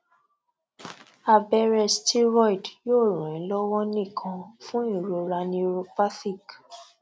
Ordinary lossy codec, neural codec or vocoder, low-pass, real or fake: none; none; none; real